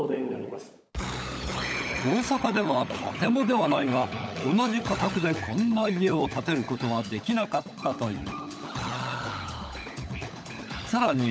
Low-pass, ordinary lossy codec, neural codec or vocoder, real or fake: none; none; codec, 16 kHz, 16 kbps, FunCodec, trained on LibriTTS, 50 frames a second; fake